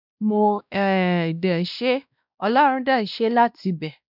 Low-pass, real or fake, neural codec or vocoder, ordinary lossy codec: 5.4 kHz; fake; codec, 16 kHz, 1 kbps, X-Codec, WavLM features, trained on Multilingual LibriSpeech; none